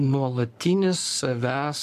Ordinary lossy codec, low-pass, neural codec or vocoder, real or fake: AAC, 96 kbps; 14.4 kHz; codec, 44.1 kHz, 7.8 kbps, Pupu-Codec; fake